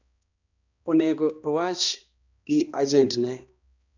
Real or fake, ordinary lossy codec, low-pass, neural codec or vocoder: fake; none; 7.2 kHz; codec, 16 kHz, 1 kbps, X-Codec, HuBERT features, trained on balanced general audio